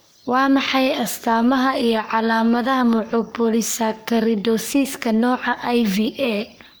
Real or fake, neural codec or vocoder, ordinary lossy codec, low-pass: fake; codec, 44.1 kHz, 3.4 kbps, Pupu-Codec; none; none